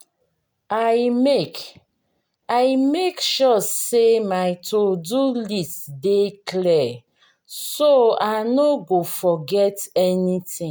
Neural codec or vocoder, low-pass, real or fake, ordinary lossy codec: none; none; real; none